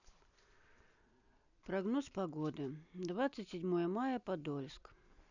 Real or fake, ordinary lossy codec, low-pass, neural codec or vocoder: real; none; 7.2 kHz; none